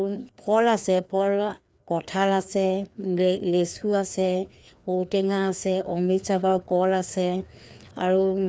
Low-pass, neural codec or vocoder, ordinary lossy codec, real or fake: none; codec, 16 kHz, 2 kbps, FreqCodec, larger model; none; fake